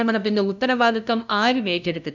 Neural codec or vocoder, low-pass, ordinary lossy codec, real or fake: codec, 16 kHz, 0.5 kbps, FunCodec, trained on LibriTTS, 25 frames a second; 7.2 kHz; none; fake